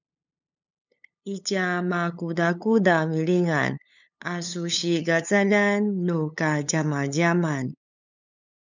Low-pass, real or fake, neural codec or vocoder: 7.2 kHz; fake; codec, 16 kHz, 8 kbps, FunCodec, trained on LibriTTS, 25 frames a second